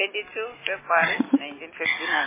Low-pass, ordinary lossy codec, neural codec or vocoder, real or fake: 3.6 kHz; MP3, 16 kbps; none; real